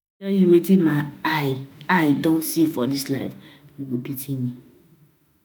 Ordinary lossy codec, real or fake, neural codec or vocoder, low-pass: none; fake; autoencoder, 48 kHz, 32 numbers a frame, DAC-VAE, trained on Japanese speech; none